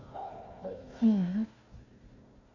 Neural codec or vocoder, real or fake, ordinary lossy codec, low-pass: codec, 16 kHz, 1 kbps, FunCodec, trained on Chinese and English, 50 frames a second; fake; none; 7.2 kHz